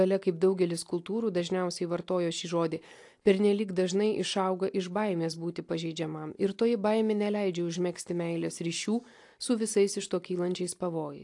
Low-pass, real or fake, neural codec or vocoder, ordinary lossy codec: 10.8 kHz; real; none; AAC, 64 kbps